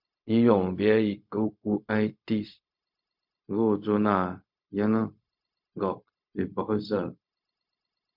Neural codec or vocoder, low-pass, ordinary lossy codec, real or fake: codec, 16 kHz, 0.4 kbps, LongCat-Audio-Codec; 5.4 kHz; MP3, 48 kbps; fake